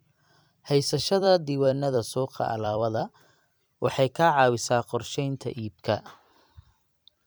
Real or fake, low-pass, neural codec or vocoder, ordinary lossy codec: fake; none; vocoder, 44.1 kHz, 128 mel bands every 256 samples, BigVGAN v2; none